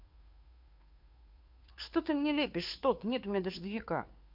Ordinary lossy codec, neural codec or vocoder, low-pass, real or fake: MP3, 48 kbps; codec, 16 kHz, 2 kbps, FunCodec, trained on Chinese and English, 25 frames a second; 5.4 kHz; fake